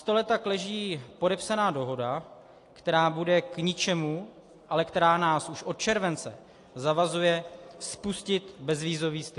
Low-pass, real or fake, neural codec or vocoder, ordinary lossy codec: 10.8 kHz; real; none; AAC, 48 kbps